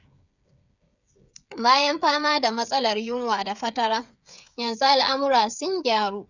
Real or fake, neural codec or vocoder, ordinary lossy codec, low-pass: fake; codec, 16 kHz, 8 kbps, FreqCodec, smaller model; none; 7.2 kHz